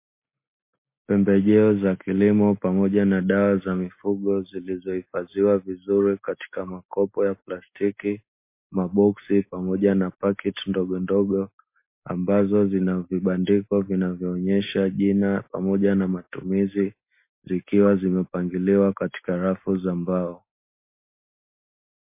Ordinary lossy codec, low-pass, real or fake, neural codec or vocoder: MP3, 24 kbps; 3.6 kHz; real; none